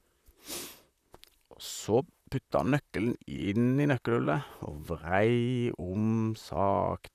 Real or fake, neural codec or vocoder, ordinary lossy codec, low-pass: fake; vocoder, 44.1 kHz, 128 mel bands, Pupu-Vocoder; none; 14.4 kHz